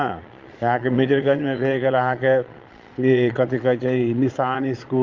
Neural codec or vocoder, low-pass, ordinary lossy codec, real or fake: vocoder, 22.05 kHz, 80 mel bands, Vocos; 7.2 kHz; Opus, 24 kbps; fake